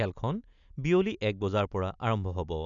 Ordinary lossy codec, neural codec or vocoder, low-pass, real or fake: none; none; 7.2 kHz; real